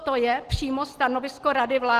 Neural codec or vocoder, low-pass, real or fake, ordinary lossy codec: none; 14.4 kHz; real; Opus, 16 kbps